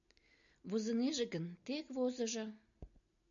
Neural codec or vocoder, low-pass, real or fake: none; 7.2 kHz; real